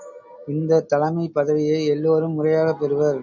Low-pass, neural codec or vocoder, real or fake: 7.2 kHz; none; real